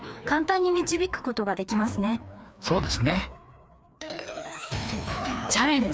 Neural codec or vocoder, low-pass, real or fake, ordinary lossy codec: codec, 16 kHz, 2 kbps, FreqCodec, larger model; none; fake; none